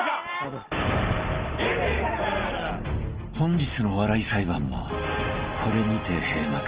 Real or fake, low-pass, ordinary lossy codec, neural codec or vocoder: real; 3.6 kHz; Opus, 16 kbps; none